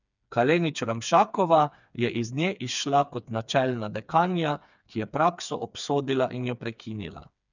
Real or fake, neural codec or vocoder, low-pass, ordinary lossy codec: fake; codec, 16 kHz, 4 kbps, FreqCodec, smaller model; 7.2 kHz; none